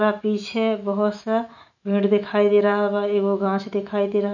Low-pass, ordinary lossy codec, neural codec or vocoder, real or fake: 7.2 kHz; none; autoencoder, 48 kHz, 128 numbers a frame, DAC-VAE, trained on Japanese speech; fake